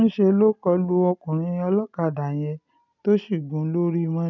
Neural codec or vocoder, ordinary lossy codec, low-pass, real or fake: none; none; 7.2 kHz; real